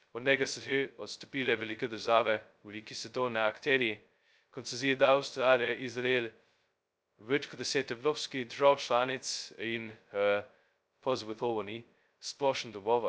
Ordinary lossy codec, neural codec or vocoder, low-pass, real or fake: none; codec, 16 kHz, 0.2 kbps, FocalCodec; none; fake